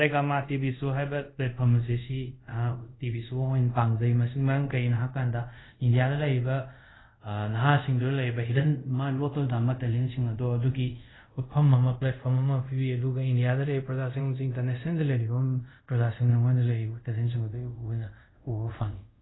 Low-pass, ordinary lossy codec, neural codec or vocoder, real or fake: 7.2 kHz; AAC, 16 kbps; codec, 24 kHz, 0.5 kbps, DualCodec; fake